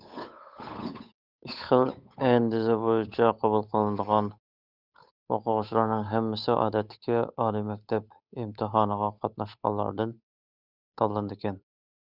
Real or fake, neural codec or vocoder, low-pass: fake; codec, 16 kHz, 8 kbps, FunCodec, trained on Chinese and English, 25 frames a second; 5.4 kHz